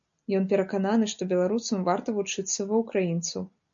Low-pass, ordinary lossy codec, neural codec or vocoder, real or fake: 7.2 kHz; MP3, 64 kbps; none; real